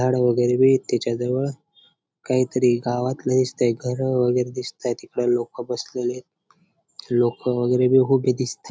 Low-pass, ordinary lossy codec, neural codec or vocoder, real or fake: none; none; none; real